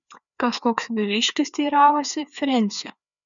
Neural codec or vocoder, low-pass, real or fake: codec, 16 kHz, 2 kbps, FreqCodec, larger model; 7.2 kHz; fake